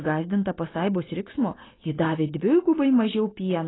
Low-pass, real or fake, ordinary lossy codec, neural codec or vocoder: 7.2 kHz; real; AAC, 16 kbps; none